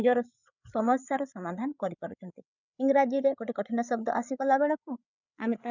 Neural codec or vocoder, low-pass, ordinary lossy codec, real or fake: codec, 16 kHz, 8 kbps, FreqCodec, larger model; 7.2 kHz; none; fake